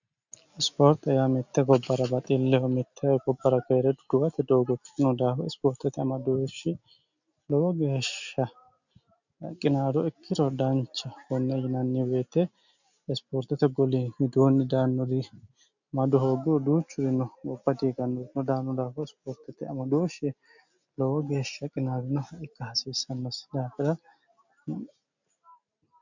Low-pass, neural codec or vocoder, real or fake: 7.2 kHz; none; real